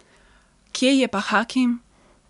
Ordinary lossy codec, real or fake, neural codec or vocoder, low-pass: none; real; none; 10.8 kHz